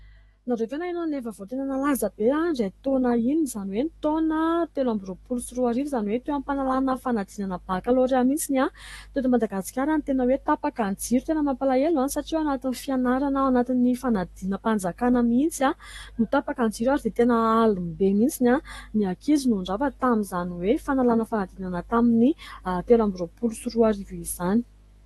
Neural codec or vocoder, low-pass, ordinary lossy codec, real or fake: autoencoder, 48 kHz, 128 numbers a frame, DAC-VAE, trained on Japanese speech; 19.8 kHz; AAC, 32 kbps; fake